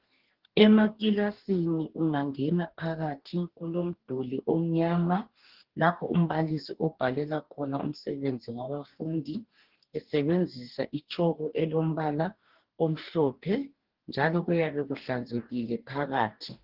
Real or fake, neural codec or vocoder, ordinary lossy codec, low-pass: fake; codec, 44.1 kHz, 2.6 kbps, DAC; Opus, 16 kbps; 5.4 kHz